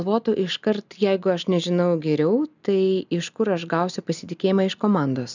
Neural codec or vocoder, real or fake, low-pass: none; real; 7.2 kHz